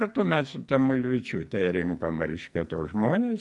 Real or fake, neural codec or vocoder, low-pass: fake; codec, 44.1 kHz, 2.6 kbps, SNAC; 10.8 kHz